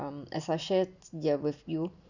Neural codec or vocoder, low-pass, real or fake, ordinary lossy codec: none; 7.2 kHz; real; none